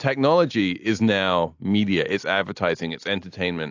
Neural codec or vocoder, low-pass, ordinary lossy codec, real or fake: none; 7.2 kHz; AAC, 48 kbps; real